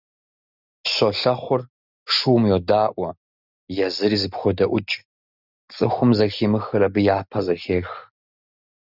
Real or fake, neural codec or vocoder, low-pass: real; none; 5.4 kHz